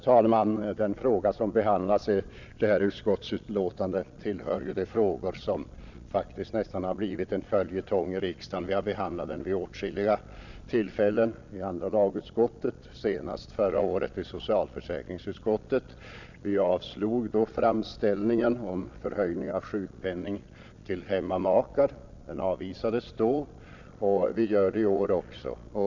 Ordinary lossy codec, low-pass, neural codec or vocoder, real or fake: none; 7.2 kHz; vocoder, 22.05 kHz, 80 mel bands, Vocos; fake